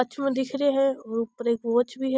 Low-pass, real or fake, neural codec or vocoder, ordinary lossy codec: none; real; none; none